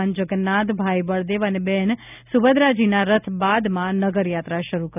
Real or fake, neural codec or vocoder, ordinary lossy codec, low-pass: real; none; none; 3.6 kHz